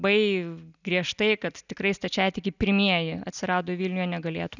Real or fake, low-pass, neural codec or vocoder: real; 7.2 kHz; none